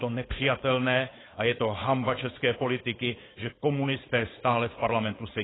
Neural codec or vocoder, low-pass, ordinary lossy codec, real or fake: codec, 16 kHz, 4.8 kbps, FACodec; 7.2 kHz; AAC, 16 kbps; fake